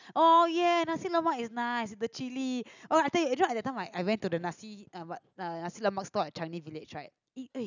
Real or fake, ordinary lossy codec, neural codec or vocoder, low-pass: real; none; none; 7.2 kHz